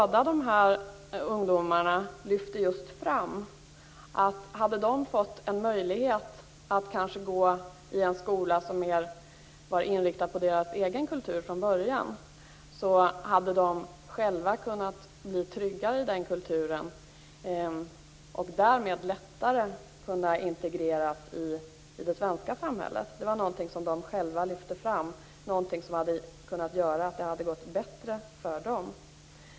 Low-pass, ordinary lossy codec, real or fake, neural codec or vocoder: none; none; real; none